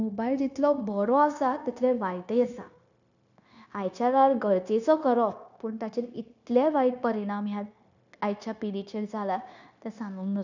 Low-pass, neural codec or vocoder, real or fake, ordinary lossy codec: 7.2 kHz; codec, 16 kHz, 0.9 kbps, LongCat-Audio-Codec; fake; none